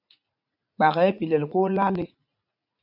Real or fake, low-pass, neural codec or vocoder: fake; 5.4 kHz; vocoder, 44.1 kHz, 80 mel bands, Vocos